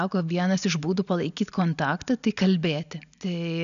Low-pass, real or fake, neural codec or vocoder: 7.2 kHz; real; none